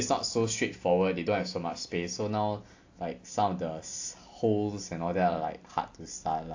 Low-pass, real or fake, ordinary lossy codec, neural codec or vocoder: 7.2 kHz; real; AAC, 48 kbps; none